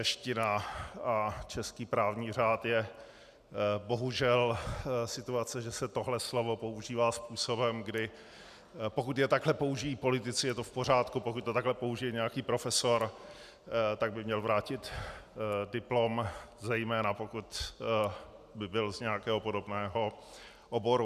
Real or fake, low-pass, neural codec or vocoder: real; 14.4 kHz; none